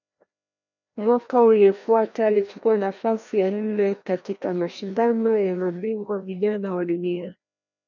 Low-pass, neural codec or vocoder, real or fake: 7.2 kHz; codec, 16 kHz, 1 kbps, FreqCodec, larger model; fake